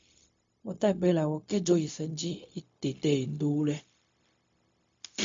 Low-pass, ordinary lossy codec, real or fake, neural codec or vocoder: 7.2 kHz; MP3, 48 kbps; fake; codec, 16 kHz, 0.4 kbps, LongCat-Audio-Codec